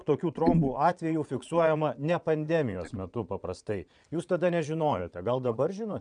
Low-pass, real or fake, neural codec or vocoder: 9.9 kHz; fake; vocoder, 22.05 kHz, 80 mel bands, Vocos